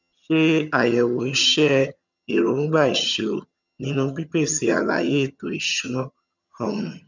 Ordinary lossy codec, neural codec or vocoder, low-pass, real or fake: none; vocoder, 22.05 kHz, 80 mel bands, HiFi-GAN; 7.2 kHz; fake